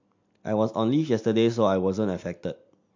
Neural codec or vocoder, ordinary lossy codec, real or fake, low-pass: none; MP3, 48 kbps; real; 7.2 kHz